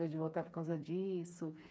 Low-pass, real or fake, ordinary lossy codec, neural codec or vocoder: none; fake; none; codec, 16 kHz, 4 kbps, FreqCodec, smaller model